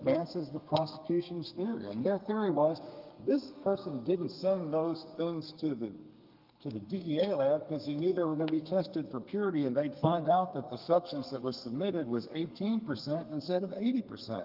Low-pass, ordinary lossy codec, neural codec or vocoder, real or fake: 5.4 kHz; Opus, 32 kbps; codec, 32 kHz, 1.9 kbps, SNAC; fake